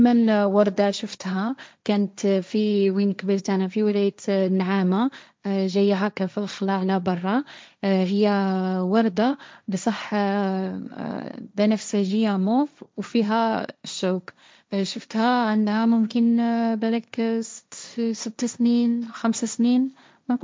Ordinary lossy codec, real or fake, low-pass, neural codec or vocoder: none; fake; none; codec, 16 kHz, 1.1 kbps, Voila-Tokenizer